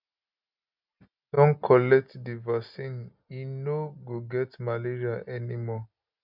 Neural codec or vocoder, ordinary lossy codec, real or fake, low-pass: none; none; real; 5.4 kHz